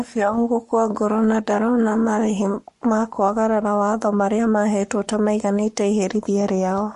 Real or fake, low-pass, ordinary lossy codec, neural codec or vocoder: fake; 14.4 kHz; MP3, 48 kbps; codec, 44.1 kHz, 7.8 kbps, Pupu-Codec